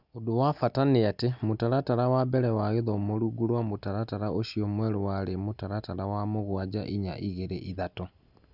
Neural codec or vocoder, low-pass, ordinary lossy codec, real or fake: none; 5.4 kHz; none; real